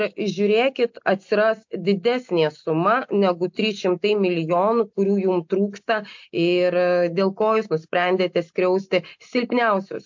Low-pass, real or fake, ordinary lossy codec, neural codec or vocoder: 7.2 kHz; real; MP3, 48 kbps; none